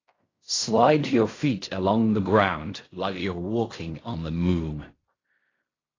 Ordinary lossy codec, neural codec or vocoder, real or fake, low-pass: AAC, 32 kbps; codec, 16 kHz in and 24 kHz out, 0.4 kbps, LongCat-Audio-Codec, fine tuned four codebook decoder; fake; 7.2 kHz